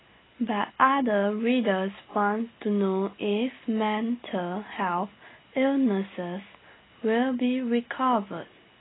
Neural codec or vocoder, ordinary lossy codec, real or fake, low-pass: none; AAC, 16 kbps; real; 7.2 kHz